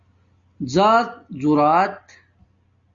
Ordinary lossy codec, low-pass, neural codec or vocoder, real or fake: Opus, 64 kbps; 7.2 kHz; none; real